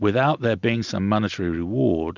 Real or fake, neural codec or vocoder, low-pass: real; none; 7.2 kHz